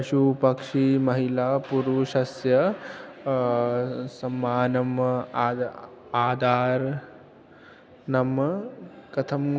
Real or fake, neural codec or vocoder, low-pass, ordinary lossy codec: real; none; none; none